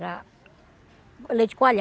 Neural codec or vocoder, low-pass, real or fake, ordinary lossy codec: none; none; real; none